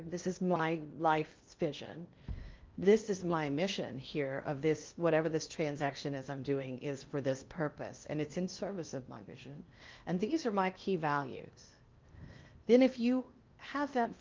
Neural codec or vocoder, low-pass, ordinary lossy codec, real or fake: codec, 16 kHz in and 24 kHz out, 0.8 kbps, FocalCodec, streaming, 65536 codes; 7.2 kHz; Opus, 24 kbps; fake